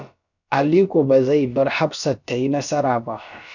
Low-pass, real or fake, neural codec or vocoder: 7.2 kHz; fake; codec, 16 kHz, about 1 kbps, DyCAST, with the encoder's durations